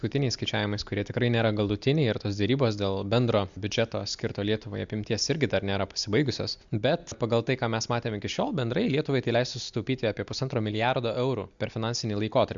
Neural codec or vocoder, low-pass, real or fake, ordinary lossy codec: none; 7.2 kHz; real; MP3, 64 kbps